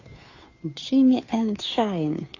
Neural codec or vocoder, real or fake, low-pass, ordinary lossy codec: codec, 16 kHz, 2 kbps, FunCodec, trained on Chinese and English, 25 frames a second; fake; 7.2 kHz; AAC, 32 kbps